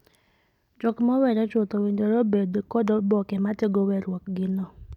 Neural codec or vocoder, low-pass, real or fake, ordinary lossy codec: none; 19.8 kHz; real; none